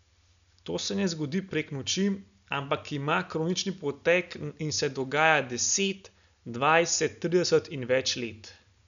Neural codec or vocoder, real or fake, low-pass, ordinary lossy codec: none; real; 7.2 kHz; none